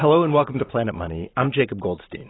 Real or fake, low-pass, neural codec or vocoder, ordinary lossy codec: real; 7.2 kHz; none; AAC, 16 kbps